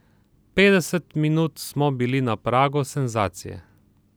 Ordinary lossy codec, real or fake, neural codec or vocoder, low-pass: none; real; none; none